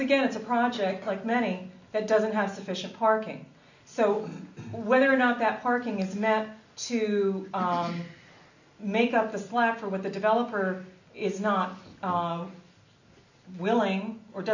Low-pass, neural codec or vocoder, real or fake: 7.2 kHz; none; real